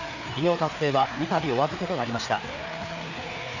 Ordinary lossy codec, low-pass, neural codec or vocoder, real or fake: none; 7.2 kHz; codec, 16 kHz, 4 kbps, FreqCodec, larger model; fake